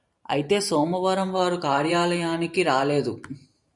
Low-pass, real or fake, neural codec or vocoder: 10.8 kHz; fake; vocoder, 44.1 kHz, 128 mel bands every 512 samples, BigVGAN v2